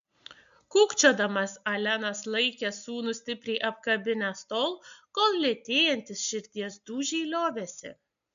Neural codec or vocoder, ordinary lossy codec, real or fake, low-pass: none; MP3, 64 kbps; real; 7.2 kHz